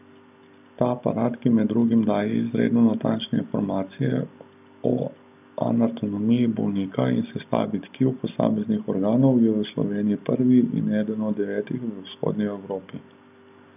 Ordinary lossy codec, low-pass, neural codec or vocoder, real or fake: none; 3.6 kHz; none; real